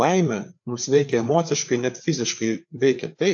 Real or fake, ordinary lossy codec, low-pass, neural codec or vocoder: fake; AAC, 64 kbps; 7.2 kHz; codec, 16 kHz, 4 kbps, FreqCodec, larger model